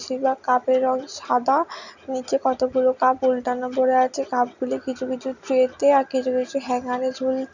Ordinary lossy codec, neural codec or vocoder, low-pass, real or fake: none; none; 7.2 kHz; real